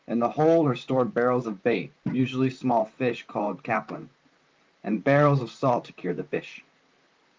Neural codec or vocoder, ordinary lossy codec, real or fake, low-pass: vocoder, 44.1 kHz, 128 mel bands, Pupu-Vocoder; Opus, 32 kbps; fake; 7.2 kHz